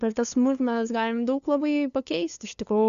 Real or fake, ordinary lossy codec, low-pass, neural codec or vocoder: fake; Opus, 64 kbps; 7.2 kHz; codec, 16 kHz, 2 kbps, FunCodec, trained on LibriTTS, 25 frames a second